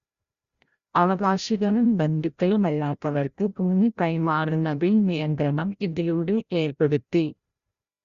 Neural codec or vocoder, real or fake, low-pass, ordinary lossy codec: codec, 16 kHz, 0.5 kbps, FreqCodec, larger model; fake; 7.2 kHz; Opus, 64 kbps